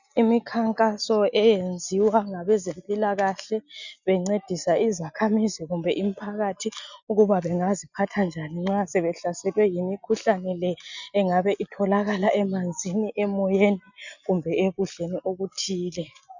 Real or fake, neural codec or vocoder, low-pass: real; none; 7.2 kHz